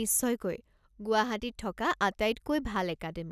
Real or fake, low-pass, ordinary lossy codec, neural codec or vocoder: real; 14.4 kHz; none; none